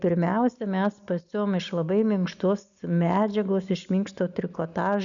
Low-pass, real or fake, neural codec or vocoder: 7.2 kHz; real; none